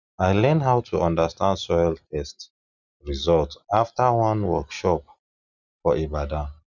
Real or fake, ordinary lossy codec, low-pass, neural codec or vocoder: real; none; 7.2 kHz; none